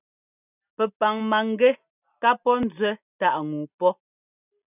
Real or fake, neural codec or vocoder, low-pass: real; none; 3.6 kHz